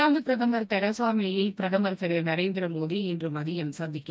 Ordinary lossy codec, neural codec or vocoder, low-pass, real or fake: none; codec, 16 kHz, 1 kbps, FreqCodec, smaller model; none; fake